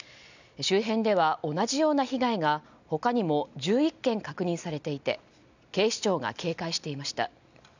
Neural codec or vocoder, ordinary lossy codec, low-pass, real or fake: none; none; 7.2 kHz; real